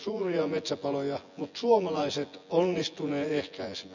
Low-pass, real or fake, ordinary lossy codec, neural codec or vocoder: 7.2 kHz; fake; none; vocoder, 24 kHz, 100 mel bands, Vocos